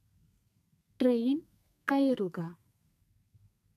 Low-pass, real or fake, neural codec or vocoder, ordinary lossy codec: 14.4 kHz; fake; codec, 32 kHz, 1.9 kbps, SNAC; none